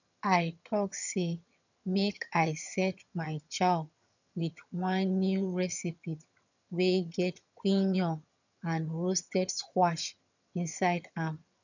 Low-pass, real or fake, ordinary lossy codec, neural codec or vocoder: 7.2 kHz; fake; none; vocoder, 22.05 kHz, 80 mel bands, HiFi-GAN